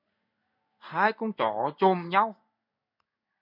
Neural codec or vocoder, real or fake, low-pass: codec, 16 kHz in and 24 kHz out, 1 kbps, XY-Tokenizer; fake; 5.4 kHz